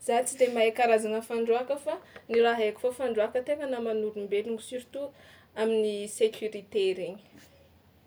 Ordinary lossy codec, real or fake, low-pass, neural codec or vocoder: none; real; none; none